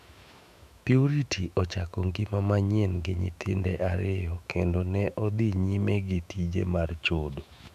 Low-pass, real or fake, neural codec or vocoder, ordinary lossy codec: 14.4 kHz; fake; autoencoder, 48 kHz, 128 numbers a frame, DAC-VAE, trained on Japanese speech; none